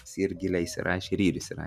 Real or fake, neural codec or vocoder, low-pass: fake; vocoder, 44.1 kHz, 128 mel bands every 256 samples, BigVGAN v2; 14.4 kHz